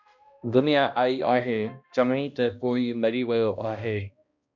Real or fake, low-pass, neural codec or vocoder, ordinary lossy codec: fake; 7.2 kHz; codec, 16 kHz, 1 kbps, X-Codec, HuBERT features, trained on balanced general audio; MP3, 64 kbps